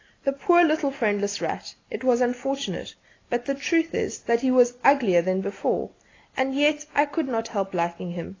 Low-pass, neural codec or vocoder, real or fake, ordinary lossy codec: 7.2 kHz; none; real; AAC, 32 kbps